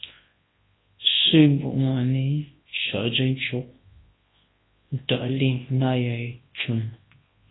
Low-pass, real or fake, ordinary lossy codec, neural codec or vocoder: 7.2 kHz; fake; AAC, 16 kbps; codec, 24 kHz, 0.9 kbps, WavTokenizer, large speech release